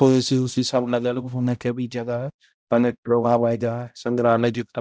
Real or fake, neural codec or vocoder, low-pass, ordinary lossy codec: fake; codec, 16 kHz, 0.5 kbps, X-Codec, HuBERT features, trained on balanced general audio; none; none